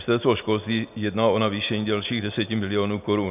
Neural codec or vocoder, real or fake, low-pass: none; real; 3.6 kHz